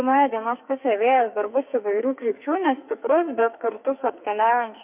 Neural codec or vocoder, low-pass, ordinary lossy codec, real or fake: codec, 44.1 kHz, 2.6 kbps, SNAC; 3.6 kHz; MP3, 32 kbps; fake